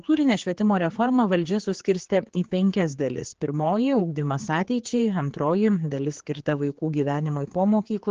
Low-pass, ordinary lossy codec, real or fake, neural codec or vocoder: 7.2 kHz; Opus, 16 kbps; fake; codec, 16 kHz, 4 kbps, X-Codec, HuBERT features, trained on general audio